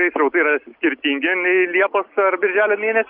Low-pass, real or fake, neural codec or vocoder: 5.4 kHz; real; none